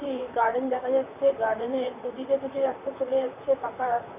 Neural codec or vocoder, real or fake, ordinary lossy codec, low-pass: vocoder, 44.1 kHz, 128 mel bands, Pupu-Vocoder; fake; none; 3.6 kHz